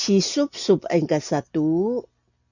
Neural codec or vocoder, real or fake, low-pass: none; real; 7.2 kHz